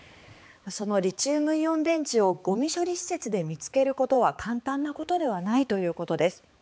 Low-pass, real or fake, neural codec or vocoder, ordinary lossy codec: none; fake; codec, 16 kHz, 4 kbps, X-Codec, HuBERT features, trained on balanced general audio; none